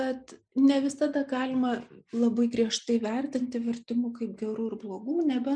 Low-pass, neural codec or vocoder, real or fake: 9.9 kHz; none; real